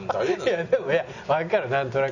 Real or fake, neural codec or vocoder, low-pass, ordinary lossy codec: real; none; 7.2 kHz; none